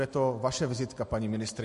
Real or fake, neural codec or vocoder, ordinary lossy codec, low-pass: real; none; MP3, 48 kbps; 14.4 kHz